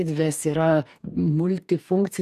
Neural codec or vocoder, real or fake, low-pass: codec, 44.1 kHz, 2.6 kbps, DAC; fake; 14.4 kHz